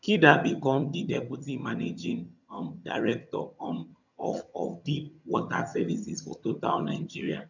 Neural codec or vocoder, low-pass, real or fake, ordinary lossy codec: vocoder, 22.05 kHz, 80 mel bands, HiFi-GAN; 7.2 kHz; fake; none